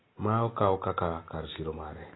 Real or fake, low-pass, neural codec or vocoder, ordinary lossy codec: real; 7.2 kHz; none; AAC, 16 kbps